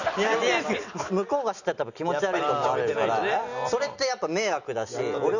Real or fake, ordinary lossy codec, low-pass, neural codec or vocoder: real; none; 7.2 kHz; none